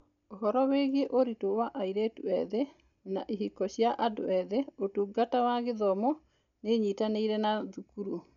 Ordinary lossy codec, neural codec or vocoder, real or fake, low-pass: none; none; real; 7.2 kHz